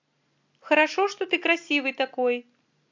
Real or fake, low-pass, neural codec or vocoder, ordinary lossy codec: real; 7.2 kHz; none; MP3, 48 kbps